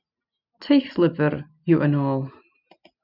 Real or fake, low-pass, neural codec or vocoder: real; 5.4 kHz; none